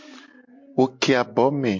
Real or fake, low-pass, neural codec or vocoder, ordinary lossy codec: real; 7.2 kHz; none; MP3, 48 kbps